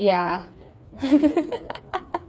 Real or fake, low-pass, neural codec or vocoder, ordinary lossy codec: fake; none; codec, 16 kHz, 4 kbps, FreqCodec, smaller model; none